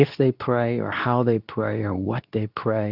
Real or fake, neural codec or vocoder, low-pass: fake; codec, 24 kHz, 0.9 kbps, WavTokenizer, medium speech release version 2; 5.4 kHz